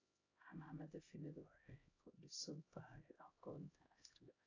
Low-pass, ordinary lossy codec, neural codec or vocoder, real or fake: 7.2 kHz; AAC, 32 kbps; codec, 16 kHz, 0.5 kbps, X-Codec, HuBERT features, trained on LibriSpeech; fake